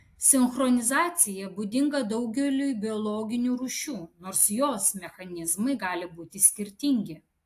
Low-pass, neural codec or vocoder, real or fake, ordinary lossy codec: 14.4 kHz; none; real; AAC, 64 kbps